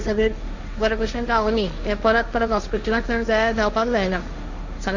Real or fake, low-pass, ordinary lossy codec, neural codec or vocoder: fake; 7.2 kHz; none; codec, 16 kHz, 1.1 kbps, Voila-Tokenizer